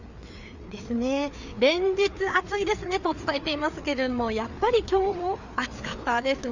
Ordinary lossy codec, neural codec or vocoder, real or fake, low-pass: none; codec, 16 kHz, 4 kbps, FreqCodec, larger model; fake; 7.2 kHz